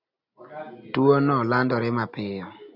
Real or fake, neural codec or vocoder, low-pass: real; none; 5.4 kHz